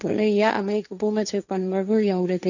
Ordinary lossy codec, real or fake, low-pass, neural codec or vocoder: none; fake; 7.2 kHz; codec, 16 kHz, 1.1 kbps, Voila-Tokenizer